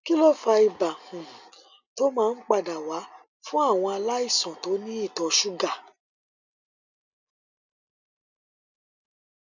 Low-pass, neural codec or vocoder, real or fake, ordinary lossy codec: 7.2 kHz; none; real; none